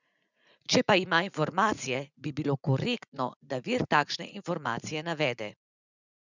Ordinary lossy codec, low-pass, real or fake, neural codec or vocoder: none; 7.2 kHz; fake; vocoder, 44.1 kHz, 80 mel bands, Vocos